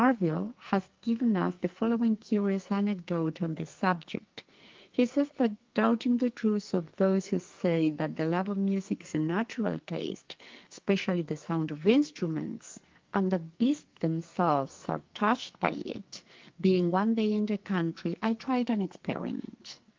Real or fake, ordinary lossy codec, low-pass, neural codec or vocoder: fake; Opus, 32 kbps; 7.2 kHz; codec, 44.1 kHz, 2.6 kbps, SNAC